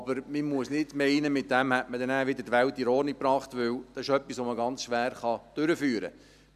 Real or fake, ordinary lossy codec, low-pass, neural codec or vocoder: real; none; 14.4 kHz; none